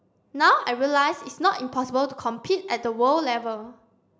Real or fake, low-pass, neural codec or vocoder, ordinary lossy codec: real; none; none; none